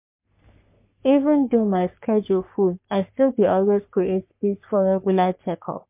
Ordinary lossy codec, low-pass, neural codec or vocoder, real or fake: MP3, 32 kbps; 3.6 kHz; codec, 44.1 kHz, 3.4 kbps, Pupu-Codec; fake